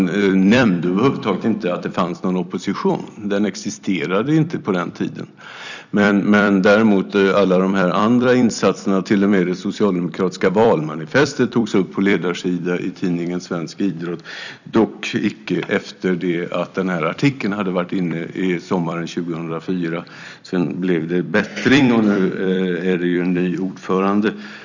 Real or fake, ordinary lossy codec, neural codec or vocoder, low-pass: fake; none; vocoder, 44.1 kHz, 128 mel bands every 256 samples, BigVGAN v2; 7.2 kHz